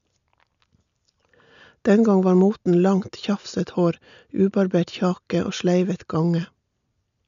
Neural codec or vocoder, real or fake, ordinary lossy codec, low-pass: none; real; none; 7.2 kHz